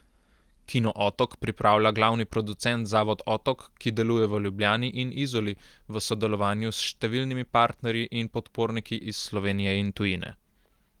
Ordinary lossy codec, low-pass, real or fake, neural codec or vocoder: Opus, 24 kbps; 19.8 kHz; real; none